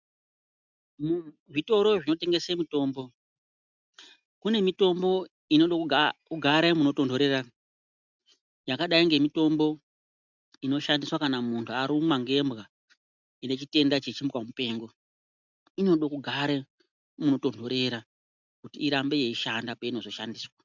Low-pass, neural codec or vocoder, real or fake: 7.2 kHz; none; real